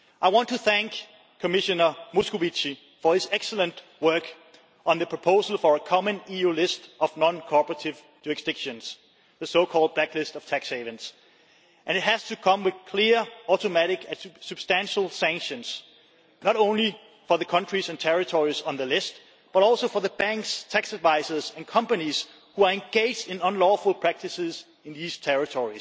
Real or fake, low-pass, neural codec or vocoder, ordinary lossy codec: real; none; none; none